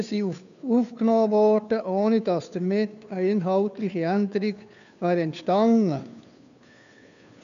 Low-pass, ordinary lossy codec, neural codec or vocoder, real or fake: 7.2 kHz; none; codec, 16 kHz, 2 kbps, FunCodec, trained on Chinese and English, 25 frames a second; fake